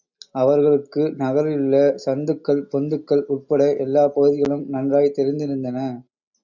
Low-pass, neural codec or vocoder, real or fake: 7.2 kHz; none; real